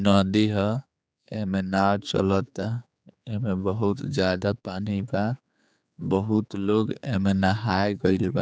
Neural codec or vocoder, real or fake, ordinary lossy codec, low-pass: codec, 16 kHz, 4 kbps, X-Codec, HuBERT features, trained on general audio; fake; none; none